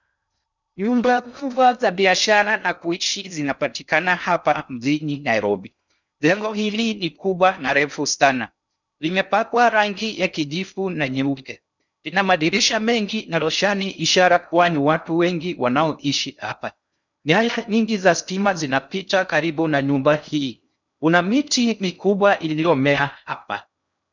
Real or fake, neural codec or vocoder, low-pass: fake; codec, 16 kHz in and 24 kHz out, 0.6 kbps, FocalCodec, streaming, 2048 codes; 7.2 kHz